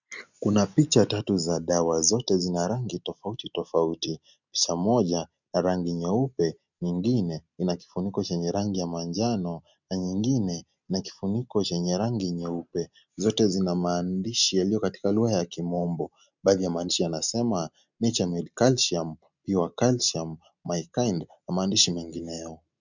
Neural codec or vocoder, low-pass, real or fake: none; 7.2 kHz; real